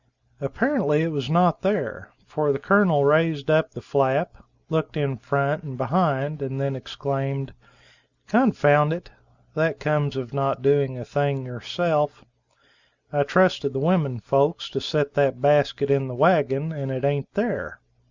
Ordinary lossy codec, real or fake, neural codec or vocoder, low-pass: Opus, 64 kbps; real; none; 7.2 kHz